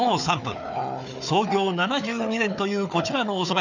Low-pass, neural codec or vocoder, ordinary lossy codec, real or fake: 7.2 kHz; codec, 16 kHz, 4 kbps, FunCodec, trained on Chinese and English, 50 frames a second; none; fake